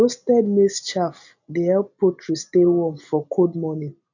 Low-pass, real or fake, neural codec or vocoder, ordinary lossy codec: 7.2 kHz; real; none; none